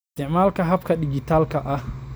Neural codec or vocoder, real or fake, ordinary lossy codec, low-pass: none; real; none; none